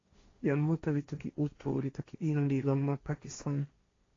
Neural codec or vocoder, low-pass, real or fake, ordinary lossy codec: codec, 16 kHz, 1.1 kbps, Voila-Tokenizer; 7.2 kHz; fake; AAC, 32 kbps